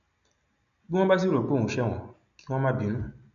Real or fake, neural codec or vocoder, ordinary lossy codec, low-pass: real; none; none; 7.2 kHz